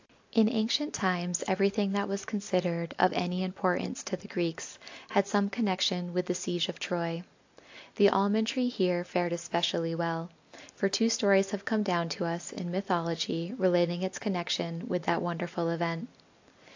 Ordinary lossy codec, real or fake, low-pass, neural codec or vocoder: AAC, 48 kbps; real; 7.2 kHz; none